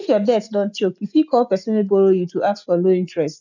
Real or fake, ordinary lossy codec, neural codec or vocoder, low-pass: real; none; none; 7.2 kHz